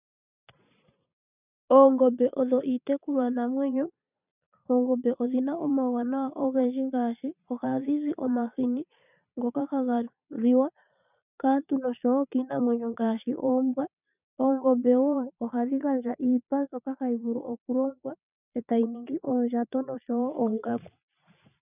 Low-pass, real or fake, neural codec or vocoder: 3.6 kHz; fake; vocoder, 22.05 kHz, 80 mel bands, Vocos